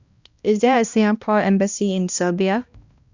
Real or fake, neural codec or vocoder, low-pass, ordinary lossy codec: fake; codec, 16 kHz, 1 kbps, X-Codec, HuBERT features, trained on balanced general audio; 7.2 kHz; Opus, 64 kbps